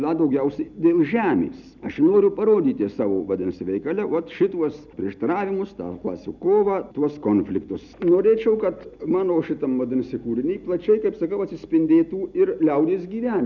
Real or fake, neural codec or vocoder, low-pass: real; none; 7.2 kHz